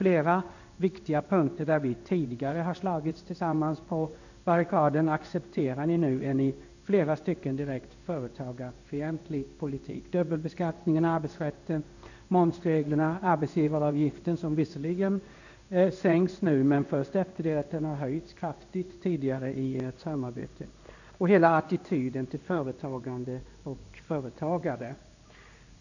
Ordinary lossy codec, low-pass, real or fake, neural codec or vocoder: none; 7.2 kHz; fake; codec, 16 kHz in and 24 kHz out, 1 kbps, XY-Tokenizer